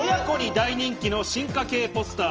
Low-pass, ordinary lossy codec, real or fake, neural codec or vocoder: 7.2 kHz; Opus, 24 kbps; real; none